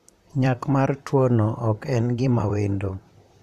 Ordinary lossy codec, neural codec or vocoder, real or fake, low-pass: none; vocoder, 44.1 kHz, 128 mel bands, Pupu-Vocoder; fake; 14.4 kHz